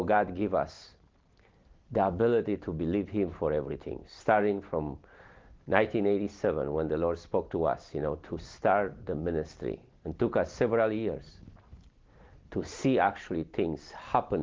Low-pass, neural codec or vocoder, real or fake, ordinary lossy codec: 7.2 kHz; none; real; Opus, 16 kbps